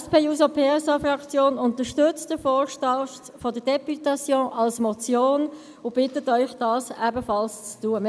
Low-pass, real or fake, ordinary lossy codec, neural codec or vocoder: none; real; none; none